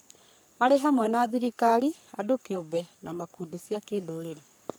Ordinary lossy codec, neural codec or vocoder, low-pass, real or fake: none; codec, 44.1 kHz, 3.4 kbps, Pupu-Codec; none; fake